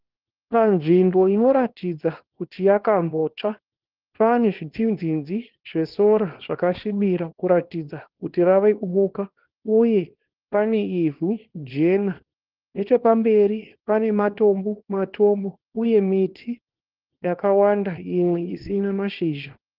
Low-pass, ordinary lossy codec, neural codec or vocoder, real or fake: 5.4 kHz; Opus, 16 kbps; codec, 24 kHz, 0.9 kbps, WavTokenizer, small release; fake